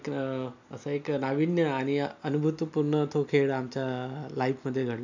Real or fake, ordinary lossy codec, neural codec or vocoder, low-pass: real; none; none; 7.2 kHz